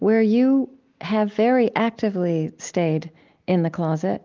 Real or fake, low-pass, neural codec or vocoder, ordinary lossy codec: real; 7.2 kHz; none; Opus, 24 kbps